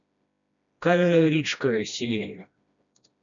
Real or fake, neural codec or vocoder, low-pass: fake; codec, 16 kHz, 1 kbps, FreqCodec, smaller model; 7.2 kHz